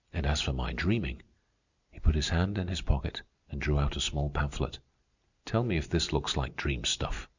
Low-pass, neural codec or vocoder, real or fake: 7.2 kHz; none; real